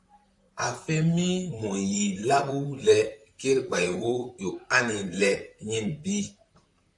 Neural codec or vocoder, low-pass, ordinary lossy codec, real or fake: vocoder, 44.1 kHz, 128 mel bands, Pupu-Vocoder; 10.8 kHz; AAC, 64 kbps; fake